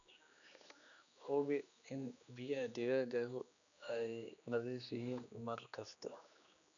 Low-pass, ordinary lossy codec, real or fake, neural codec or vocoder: 7.2 kHz; none; fake; codec, 16 kHz, 2 kbps, X-Codec, HuBERT features, trained on general audio